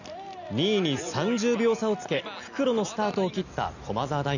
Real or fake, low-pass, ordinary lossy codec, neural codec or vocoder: real; 7.2 kHz; none; none